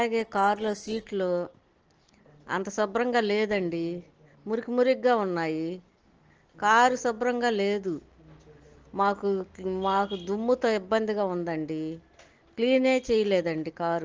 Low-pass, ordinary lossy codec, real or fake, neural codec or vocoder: 7.2 kHz; Opus, 16 kbps; real; none